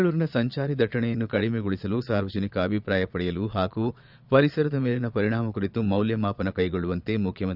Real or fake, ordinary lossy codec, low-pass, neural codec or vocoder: fake; none; 5.4 kHz; vocoder, 22.05 kHz, 80 mel bands, Vocos